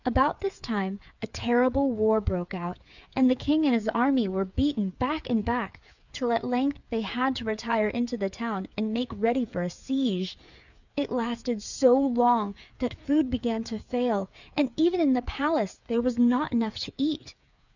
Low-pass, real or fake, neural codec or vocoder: 7.2 kHz; fake; codec, 16 kHz, 8 kbps, FreqCodec, smaller model